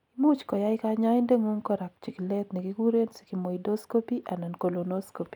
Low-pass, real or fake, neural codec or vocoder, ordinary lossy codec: 19.8 kHz; real; none; none